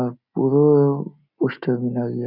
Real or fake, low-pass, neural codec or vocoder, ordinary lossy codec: real; 5.4 kHz; none; none